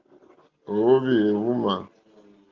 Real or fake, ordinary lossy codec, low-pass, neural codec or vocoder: real; Opus, 32 kbps; 7.2 kHz; none